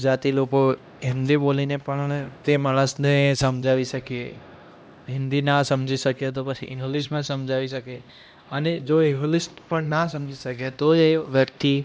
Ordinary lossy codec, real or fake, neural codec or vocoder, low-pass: none; fake; codec, 16 kHz, 1 kbps, X-Codec, HuBERT features, trained on LibriSpeech; none